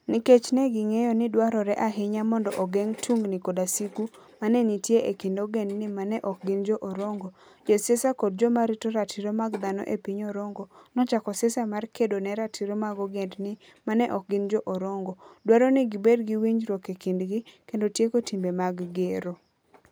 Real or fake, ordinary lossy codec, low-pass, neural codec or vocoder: real; none; none; none